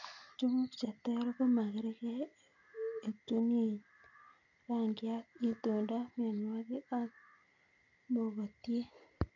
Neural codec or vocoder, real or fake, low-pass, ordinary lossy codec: none; real; 7.2 kHz; none